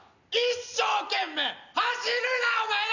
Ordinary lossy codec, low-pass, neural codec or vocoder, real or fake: none; 7.2 kHz; none; real